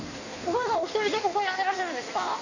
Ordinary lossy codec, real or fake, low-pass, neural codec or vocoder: none; fake; 7.2 kHz; codec, 16 kHz in and 24 kHz out, 1.1 kbps, FireRedTTS-2 codec